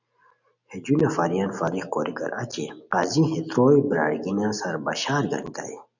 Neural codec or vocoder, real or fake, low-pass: none; real; 7.2 kHz